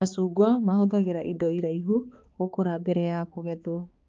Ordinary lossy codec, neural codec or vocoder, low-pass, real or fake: Opus, 24 kbps; codec, 16 kHz, 2 kbps, X-Codec, HuBERT features, trained on balanced general audio; 7.2 kHz; fake